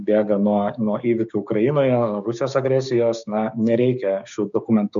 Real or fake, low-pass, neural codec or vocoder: fake; 7.2 kHz; codec, 16 kHz, 6 kbps, DAC